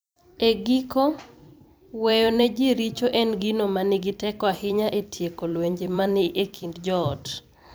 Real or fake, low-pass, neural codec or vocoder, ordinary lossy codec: fake; none; vocoder, 44.1 kHz, 128 mel bands every 256 samples, BigVGAN v2; none